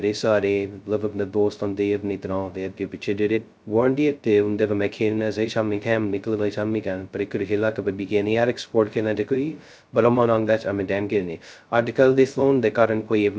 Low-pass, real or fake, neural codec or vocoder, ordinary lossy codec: none; fake; codec, 16 kHz, 0.2 kbps, FocalCodec; none